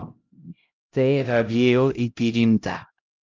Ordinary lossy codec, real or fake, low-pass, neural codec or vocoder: Opus, 32 kbps; fake; 7.2 kHz; codec, 16 kHz, 0.5 kbps, X-Codec, HuBERT features, trained on LibriSpeech